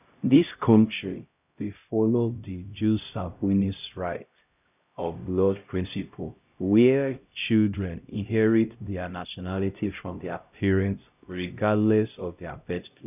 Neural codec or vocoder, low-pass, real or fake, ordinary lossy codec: codec, 16 kHz, 0.5 kbps, X-Codec, HuBERT features, trained on LibriSpeech; 3.6 kHz; fake; none